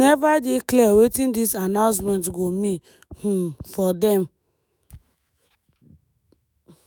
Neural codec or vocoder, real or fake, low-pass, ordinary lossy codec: autoencoder, 48 kHz, 128 numbers a frame, DAC-VAE, trained on Japanese speech; fake; none; none